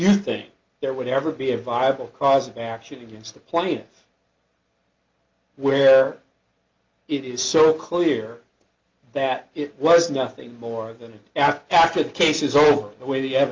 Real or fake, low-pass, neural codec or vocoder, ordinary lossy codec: real; 7.2 kHz; none; Opus, 24 kbps